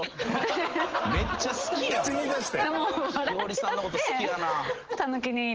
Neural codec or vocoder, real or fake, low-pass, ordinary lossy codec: none; real; 7.2 kHz; Opus, 16 kbps